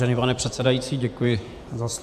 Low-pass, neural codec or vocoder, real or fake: 14.4 kHz; vocoder, 48 kHz, 128 mel bands, Vocos; fake